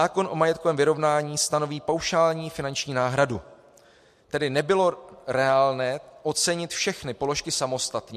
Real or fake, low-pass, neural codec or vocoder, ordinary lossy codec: real; 14.4 kHz; none; MP3, 64 kbps